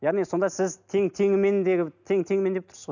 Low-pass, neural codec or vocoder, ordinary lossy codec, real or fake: 7.2 kHz; none; none; real